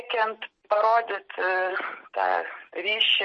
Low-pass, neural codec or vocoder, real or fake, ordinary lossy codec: 10.8 kHz; none; real; MP3, 32 kbps